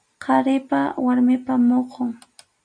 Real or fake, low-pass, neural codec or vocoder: real; 9.9 kHz; none